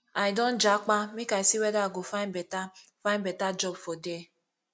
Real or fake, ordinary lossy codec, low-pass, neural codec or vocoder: real; none; none; none